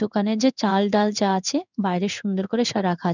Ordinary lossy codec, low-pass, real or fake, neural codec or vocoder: none; 7.2 kHz; fake; codec, 16 kHz in and 24 kHz out, 1 kbps, XY-Tokenizer